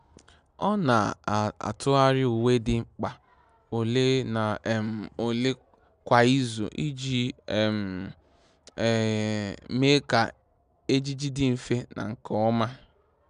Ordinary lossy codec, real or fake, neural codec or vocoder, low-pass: none; real; none; 9.9 kHz